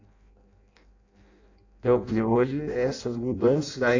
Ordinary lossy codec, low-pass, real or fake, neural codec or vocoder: AAC, 48 kbps; 7.2 kHz; fake; codec, 16 kHz in and 24 kHz out, 0.6 kbps, FireRedTTS-2 codec